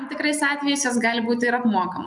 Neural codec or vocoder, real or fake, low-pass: none; real; 10.8 kHz